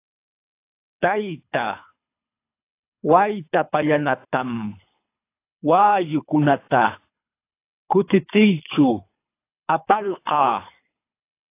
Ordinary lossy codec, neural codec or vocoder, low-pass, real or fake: AAC, 24 kbps; codec, 24 kHz, 3 kbps, HILCodec; 3.6 kHz; fake